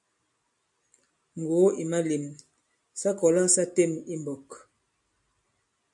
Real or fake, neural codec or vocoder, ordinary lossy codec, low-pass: real; none; MP3, 96 kbps; 10.8 kHz